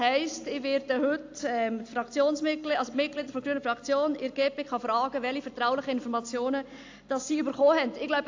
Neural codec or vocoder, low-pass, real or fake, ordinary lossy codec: none; 7.2 kHz; real; AAC, 48 kbps